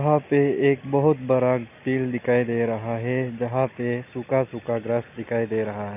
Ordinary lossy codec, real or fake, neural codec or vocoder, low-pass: none; real; none; 3.6 kHz